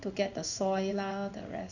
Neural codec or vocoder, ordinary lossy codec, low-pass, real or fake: codec, 16 kHz in and 24 kHz out, 1 kbps, XY-Tokenizer; none; 7.2 kHz; fake